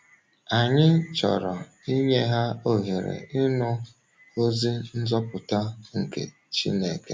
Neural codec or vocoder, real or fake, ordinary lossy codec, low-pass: none; real; none; none